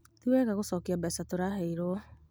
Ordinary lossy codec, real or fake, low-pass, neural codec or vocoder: none; real; none; none